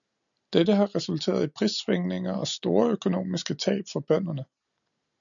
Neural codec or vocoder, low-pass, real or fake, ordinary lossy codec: none; 7.2 kHz; real; MP3, 96 kbps